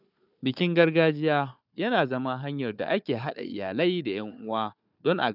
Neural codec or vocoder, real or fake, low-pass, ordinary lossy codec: codec, 16 kHz, 4 kbps, FunCodec, trained on Chinese and English, 50 frames a second; fake; 5.4 kHz; none